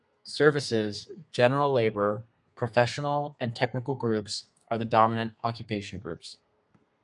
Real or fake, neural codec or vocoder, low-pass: fake; codec, 32 kHz, 1.9 kbps, SNAC; 10.8 kHz